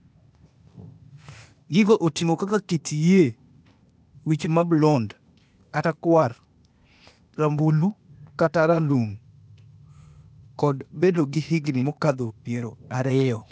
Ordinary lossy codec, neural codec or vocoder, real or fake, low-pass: none; codec, 16 kHz, 0.8 kbps, ZipCodec; fake; none